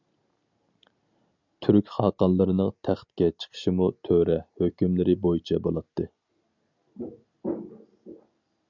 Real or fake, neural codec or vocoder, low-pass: real; none; 7.2 kHz